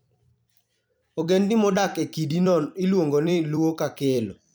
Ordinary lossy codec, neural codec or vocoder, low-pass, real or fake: none; vocoder, 44.1 kHz, 128 mel bands every 256 samples, BigVGAN v2; none; fake